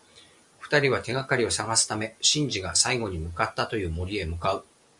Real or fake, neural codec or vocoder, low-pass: real; none; 10.8 kHz